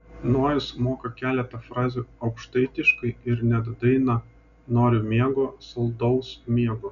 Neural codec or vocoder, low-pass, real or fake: none; 7.2 kHz; real